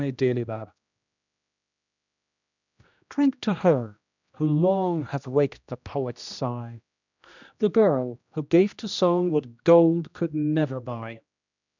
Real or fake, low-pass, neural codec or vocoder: fake; 7.2 kHz; codec, 16 kHz, 1 kbps, X-Codec, HuBERT features, trained on general audio